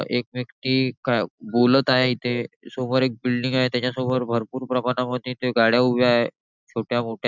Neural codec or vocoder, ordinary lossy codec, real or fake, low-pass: none; none; real; 7.2 kHz